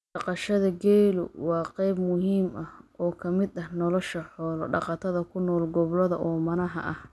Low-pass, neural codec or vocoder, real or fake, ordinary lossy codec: none; none; real; none